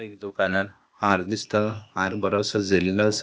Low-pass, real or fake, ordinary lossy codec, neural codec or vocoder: none; fake; none; codec, 16 kHz, 0.8 kbps, ZipCodec